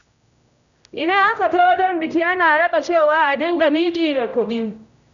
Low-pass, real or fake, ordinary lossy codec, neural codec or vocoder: 7.2 kHz; fake; none; codec, 16 kHz, 0.5 kbps, X-Codec, HuBERT features, trained on general audio